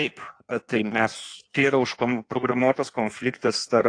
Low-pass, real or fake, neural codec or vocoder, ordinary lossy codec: 9.9 kHz; fake; codec, 16 kHz in and 24 kHz out, 1.1 kbps, FireRedTTS-2 codec; AAC, 48 kbps